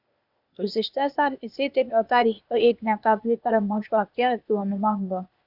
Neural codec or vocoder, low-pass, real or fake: codec, 16 kHz, 0.8 kbps, ZipCodec; 5.4 kHz; fake